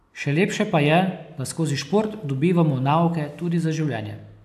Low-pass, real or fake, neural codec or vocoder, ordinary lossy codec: 14.4 kHz; real; none; AAC, 96 kbps